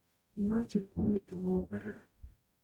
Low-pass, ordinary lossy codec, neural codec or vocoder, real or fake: 19.8 kHz; MP3, 96 kbps; codec, 44.1 kHz, 0.9 kbps, DAC; fake